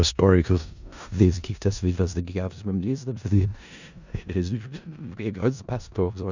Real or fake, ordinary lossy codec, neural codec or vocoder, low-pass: fake; none; codec, 16 kHz in and 24 kHz out, 0.4 kbps, LongCat-Audio-Codec, four codebook decoder; 7.2 kHz